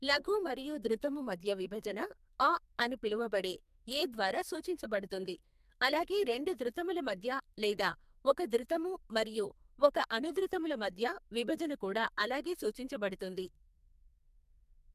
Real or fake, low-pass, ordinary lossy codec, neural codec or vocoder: fake; 14.4 kHz; MP3, 96 kbps; codec, 44.1 kHz, 2.6 kbps, SNAC